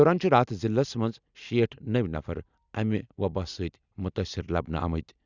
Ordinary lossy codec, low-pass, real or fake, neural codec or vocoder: Opus, 64 kbps; 7.2 kHz; real; none